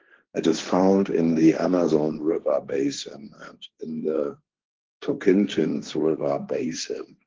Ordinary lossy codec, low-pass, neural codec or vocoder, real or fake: Opus, 16 kbps; 7.2 kHz; codec, 16 kHz, 1.1 kbps, Voila-Tokenizer; fake